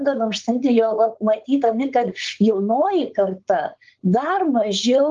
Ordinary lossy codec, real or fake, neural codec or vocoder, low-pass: Opus, 24 kbps; fake; codec, 16 kHz, 4.8 kbps, FACodec; 7.2 kHz